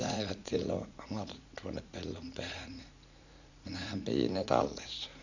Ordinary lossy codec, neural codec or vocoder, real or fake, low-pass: none; vocoder, 44.1 kHz, 80 mel bands, Vocos; fake; 7.2 kHz